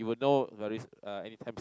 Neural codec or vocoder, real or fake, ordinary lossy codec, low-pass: none; real; none; none